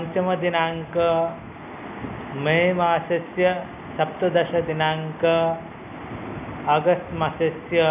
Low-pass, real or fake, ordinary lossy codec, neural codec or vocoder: 3.6 kHz; real; none; none